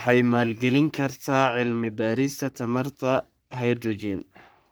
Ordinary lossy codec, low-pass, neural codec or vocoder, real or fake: none; none; codec, 44.1 kHz, 3.4 kbps, Pupu-Codec; fake